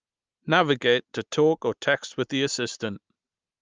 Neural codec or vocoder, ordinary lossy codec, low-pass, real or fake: none; Opus, 24 kbps; 7.2 kHz; real